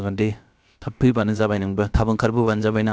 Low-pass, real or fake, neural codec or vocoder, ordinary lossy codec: none; fake; codec, 16 kHz, about 1 kbps, DyCAST, with the encoder's durations; none